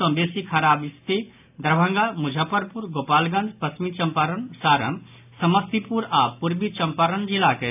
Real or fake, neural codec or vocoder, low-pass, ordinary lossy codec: real; none; 3.6 kHz; AAC, 32 kbps